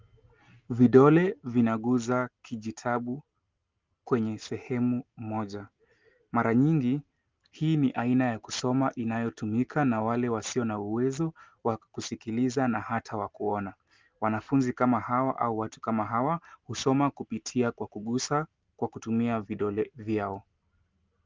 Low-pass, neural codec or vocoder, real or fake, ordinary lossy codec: 7.2 kHz; none; real; Opus, 32 kbps